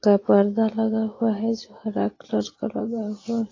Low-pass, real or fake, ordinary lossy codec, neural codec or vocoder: 7.2 kHz; real; AAC, 32 kbps; none